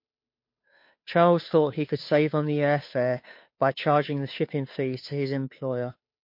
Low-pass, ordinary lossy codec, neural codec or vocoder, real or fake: 5.4 kHz; MP3, 32 kbps; codec, 16 kHz, 2 kbps, FunCodec, trained on Chinese and English, 25 frames a second; fake